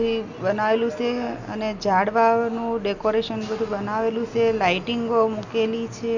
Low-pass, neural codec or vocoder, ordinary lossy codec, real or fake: 7.2 kHz; none; none; real